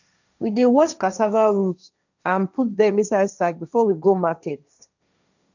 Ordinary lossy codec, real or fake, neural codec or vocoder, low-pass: none; fake; codec, 16 kHz, 1.1 kbps, Voila-Tokenizer; 7.2 kHz